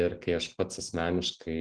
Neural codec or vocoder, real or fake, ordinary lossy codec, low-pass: none; real; Opus, 16 kbps; 10.8 kHz